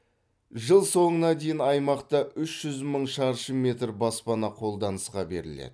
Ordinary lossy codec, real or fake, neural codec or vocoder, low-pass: none; real; none; none